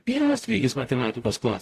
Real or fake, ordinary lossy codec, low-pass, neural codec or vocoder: fake; AAC, 64 kbps; 14.4 kHz; codec, 44.1 kHz, 0.9 kbps, DAC